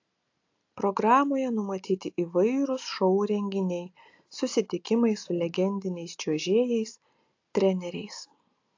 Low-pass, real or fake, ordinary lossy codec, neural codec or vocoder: 7.2 kHz; real; AAC, 48 kbps; none